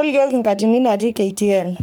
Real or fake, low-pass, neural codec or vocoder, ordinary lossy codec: fake; none; codec, 44.1 kHz, 3.4 kbps, Pupu-Codec; none